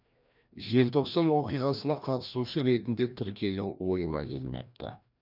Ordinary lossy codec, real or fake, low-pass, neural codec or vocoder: none; fake; 5.4 kHz; codec, 16 kHz, 1 kbps, FreqCodec, larger model